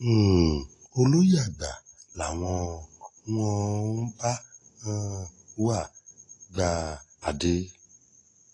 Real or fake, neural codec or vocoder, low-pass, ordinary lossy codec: real; none; 10.8 kHz; AAC, 32 kbps